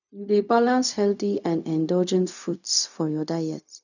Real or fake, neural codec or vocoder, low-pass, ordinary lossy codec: fake; codec, 16 kHz, 0.4 kbps, LongCat-Audio-Codec; 7.2 kHz; none